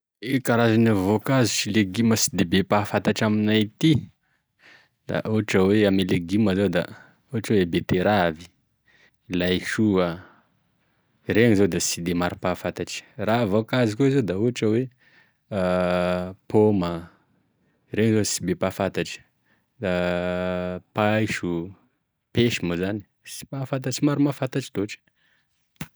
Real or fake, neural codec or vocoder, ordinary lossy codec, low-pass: real; none; none; none